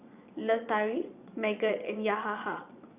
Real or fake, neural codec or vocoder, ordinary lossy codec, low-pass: fake; vocoder, 22.05 kHz, 80 mel bands, Vocos; Opus, 64 kbps; 3.6 kHz